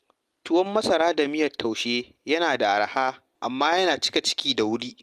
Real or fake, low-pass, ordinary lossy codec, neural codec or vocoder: real; 14.4 kHz; Opus, 32 kbps; none